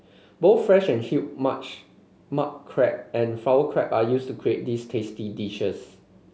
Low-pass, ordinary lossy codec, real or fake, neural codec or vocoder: none; none; real; none